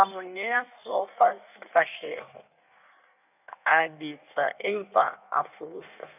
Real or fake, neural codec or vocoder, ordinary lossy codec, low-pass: fake; codec, 16 kHz in and 24 kHz out, 1.1 kbps, FireRedTTS-2 codec; none; 3.6 kHz